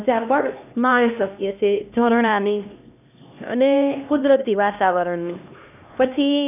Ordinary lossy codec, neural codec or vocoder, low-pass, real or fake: none; codec, 16 kHz, 1 kbps, X-Codec, HuBERT features, trained on LibriSpeech; 3.6 kHz; fake